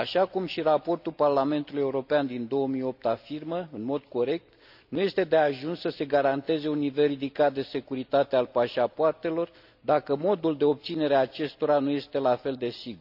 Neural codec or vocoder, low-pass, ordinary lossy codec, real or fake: none; 5.4 kHz; none; real